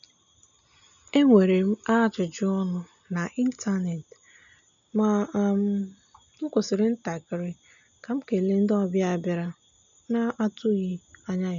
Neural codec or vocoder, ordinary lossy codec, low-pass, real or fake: none; none; 7.2 kHz; real